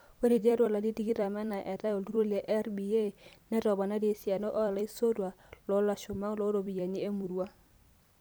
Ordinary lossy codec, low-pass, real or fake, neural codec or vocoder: none; none; fake; vocoder, 44.1 kHz, 128 mel bands every 256 samples, BigVGAN v2